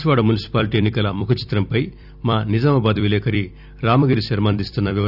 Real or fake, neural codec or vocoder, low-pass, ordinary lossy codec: fake; vocoder, 44.1 kHz, 128 mel bands every 256 samples, BigVGAN v2; 5.4 kHz; none